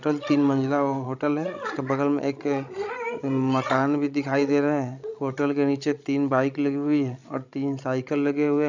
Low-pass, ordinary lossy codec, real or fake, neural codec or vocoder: 7.2 kHz; none; fake; codec, 16 kHz, 16 kbps, FreqCodec, larger model